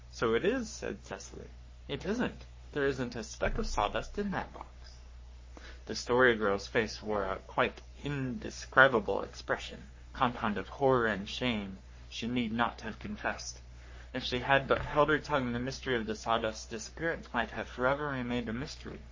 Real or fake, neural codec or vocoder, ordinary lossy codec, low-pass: fake; codec, 44.1 kHz, 3.4 kbps, Pupu-Codec; MP3, 32 kbps; 7.2 kHz